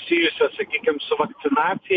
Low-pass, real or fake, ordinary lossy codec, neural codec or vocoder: 7.2 kHz; real; MP3, 64 kbps; none